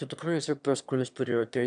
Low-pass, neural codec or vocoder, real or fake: 9.9 kHz; autoencoder, 22.05 kHz, a latent of 192 numbers a frame, VITS, trained on one speaker; fake